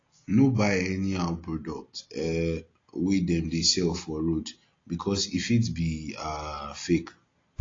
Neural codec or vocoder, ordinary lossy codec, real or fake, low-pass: none; AAC, 32 kbps; real; 7.2 kHz